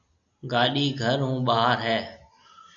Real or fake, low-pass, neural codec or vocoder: real; 7.2 kHz; none